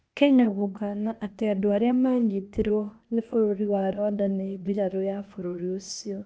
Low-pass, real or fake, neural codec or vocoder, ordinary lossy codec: none; fake; codec, 16 kHz, 0.8 kbps, ZipCodec; none